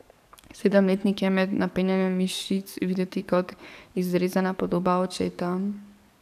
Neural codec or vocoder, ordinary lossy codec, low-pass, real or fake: codec, 44.1 kHz, 7.8 kbps, DAC; none; 14.4 kHz; fake